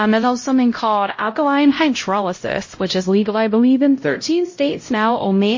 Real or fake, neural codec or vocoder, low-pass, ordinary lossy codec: fake; codec, 16 kHz, 0.5 kbps, X-Codec, HuBERT features, trained on LibriSpeech; 7.2 kHz; MP3, 32 kbps